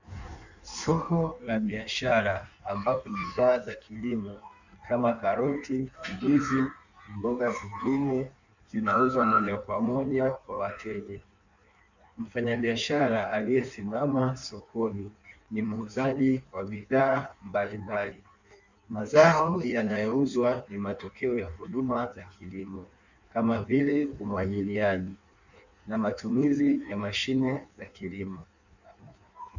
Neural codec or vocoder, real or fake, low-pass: codec, 16 kHz in and 24 kHz out, 1.1 kbps, FireRedTTS-2 codec; fake; 7.2 kHz